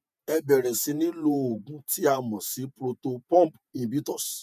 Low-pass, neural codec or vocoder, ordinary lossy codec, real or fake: 14.4 kHz; vocoder, 48 kHz, 128 mel bands, Vocos; none; fake